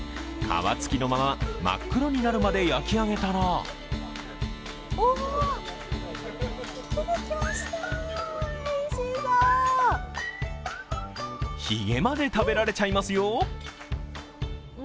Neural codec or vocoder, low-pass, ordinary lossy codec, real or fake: none; none; none; real